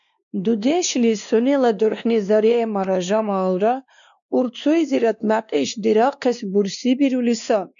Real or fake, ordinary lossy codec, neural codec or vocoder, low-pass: fake; MP3, 96 kbps; codec, 16 kHz, 2 kbps, X-Codec, WavLM features, trained on Multilingual LibriSpeech; 7.2 kHz